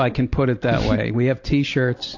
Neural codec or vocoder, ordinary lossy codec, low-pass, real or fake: none; AAC, 48 kbps; 7.2 kHz; real